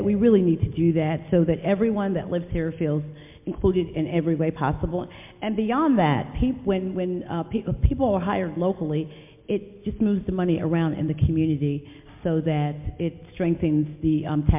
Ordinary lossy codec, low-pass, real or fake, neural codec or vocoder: MP3, 32 kbps; 3.6 kHz; real; none